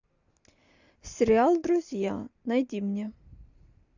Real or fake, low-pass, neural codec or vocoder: real; 7.2 kHz; none